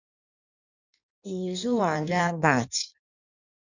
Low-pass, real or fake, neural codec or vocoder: 7.2 kHz; fake; codec, 16 kHz in and 24 kHz out, 1.1 kbps, FireRedTTS-2 codec